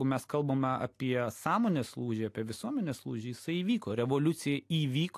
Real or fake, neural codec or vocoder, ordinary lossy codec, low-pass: real; none; AAC, 64 kbps; 14.4 kHz